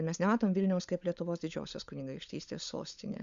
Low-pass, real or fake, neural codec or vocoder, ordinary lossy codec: 7.2 kHz; fake; codec, 16 kHz, 16 kbps, FunCodec, trained on LibriTTS, 50 frames a second; Opus, 64 kbps